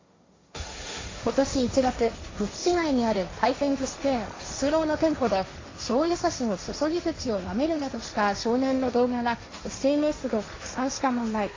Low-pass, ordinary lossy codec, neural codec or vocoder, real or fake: 7.2 kHz; AAC, 32 kbps; codec, 16 kHz, 1.1 kbps, Voila-Tokenizer; fake